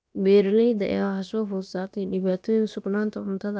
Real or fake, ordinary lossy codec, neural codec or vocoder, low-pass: fake; none; codec, 16 kHz, about 1 kbps, DyCAST, with the encoder's durations; none